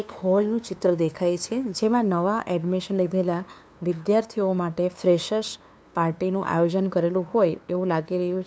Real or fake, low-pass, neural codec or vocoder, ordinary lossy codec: fake; none; codec, 16 kHz, 2 kbps, FunCodec, trained on LibriTTS, 25 frames a second; none